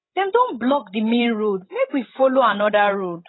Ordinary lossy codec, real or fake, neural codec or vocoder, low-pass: AAC, 16 kbps; fake; codec, 16 kHz, 16 kbps, FreqCodec, larger model; 7.2 kHz